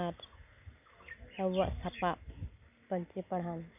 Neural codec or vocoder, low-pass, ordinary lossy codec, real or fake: none; 3.6 kHz; none; real